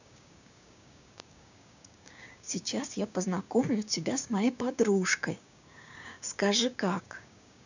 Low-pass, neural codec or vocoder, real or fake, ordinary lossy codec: 7.2 kHz; codec, 16 kHz, 6 kbps, DAC; fake; none